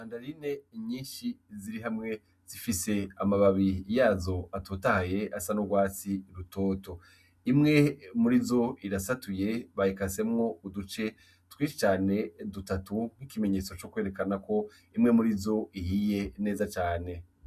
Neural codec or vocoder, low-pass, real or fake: none; 14.4 kHz; real